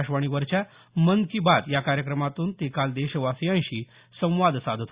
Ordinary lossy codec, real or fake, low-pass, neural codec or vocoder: Opus, 64 kbps; real; 3.6 kHz; none